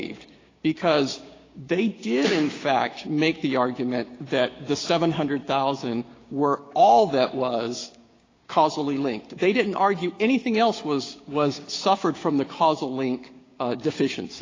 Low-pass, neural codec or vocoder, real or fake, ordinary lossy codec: 7.2 kHz; codec, 16 kHz, 6 kbps, DAC; fake; AAC, 32 kbps